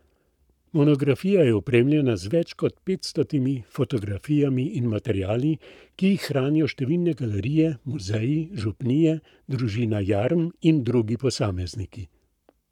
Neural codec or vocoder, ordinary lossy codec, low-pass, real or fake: codec, 44.1 kHz, 7.8 kbps, Pupu-Codec; none; 19.8 kHz; fake